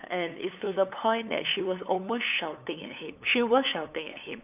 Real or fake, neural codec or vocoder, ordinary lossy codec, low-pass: fake; codec, 16 kHz, 8 kbps, FunCodec, trained on LibriTTS, 25 frames a second; none; 3.6 kHz